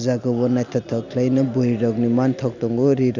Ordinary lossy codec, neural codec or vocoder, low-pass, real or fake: none; none; 7.2 kHz; real